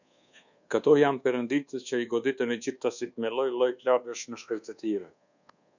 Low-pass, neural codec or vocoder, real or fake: 7.2 kHz; codec, 24 kHz, 1.2 kbps, DualCodec; fake